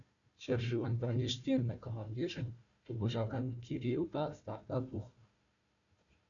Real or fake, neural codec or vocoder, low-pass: fake; codec, 16 kHz, 1 kbps, FunCodec, trained on Chinese and English, 50 frames a second; 7.2 kHz